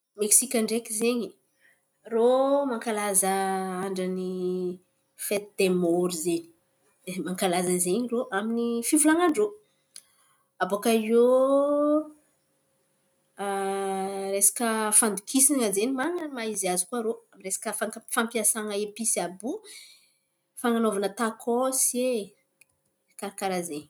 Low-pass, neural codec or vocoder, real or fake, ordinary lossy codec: none; none; real; none